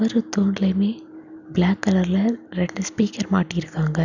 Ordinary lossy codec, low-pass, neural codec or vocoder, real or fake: none; 7.2 kHz; none; real